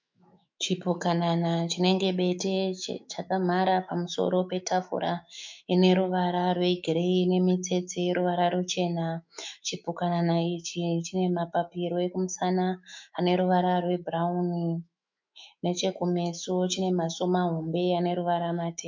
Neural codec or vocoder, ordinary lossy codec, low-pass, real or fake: autoencoder, 48 kHz, 128 numbers a frame, DAC-VAE, trained on Japanese speech; MP3, 64 kbps; 7.2 kHz; fake